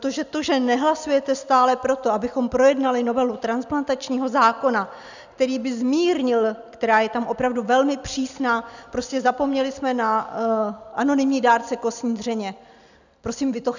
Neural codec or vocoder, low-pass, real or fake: none; 7.2 kHz; real